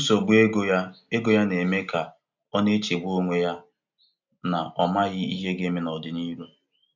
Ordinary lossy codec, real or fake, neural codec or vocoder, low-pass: none; real; none; 7.2 kHz